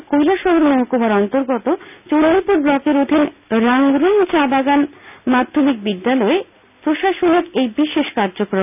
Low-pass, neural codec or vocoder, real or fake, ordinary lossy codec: 3.6 kHz; none; real; none